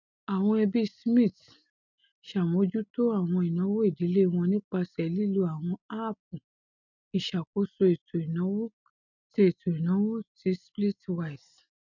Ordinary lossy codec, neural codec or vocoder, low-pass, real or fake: none; none; 7.2 kHz; real